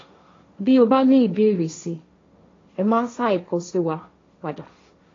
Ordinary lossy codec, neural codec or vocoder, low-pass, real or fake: AAC, 32 kbps; codec, 16 kHz, 1.1 kbps, Voila-Tokenizer; 7.2 kHz; fake